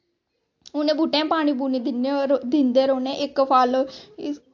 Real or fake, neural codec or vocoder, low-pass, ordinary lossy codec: real; none; 7.2 kHz; AAC, 48 kbps